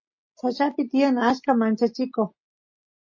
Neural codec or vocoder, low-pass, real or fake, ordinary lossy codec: none; 7.2 kHz; real; MP3, 32 kbps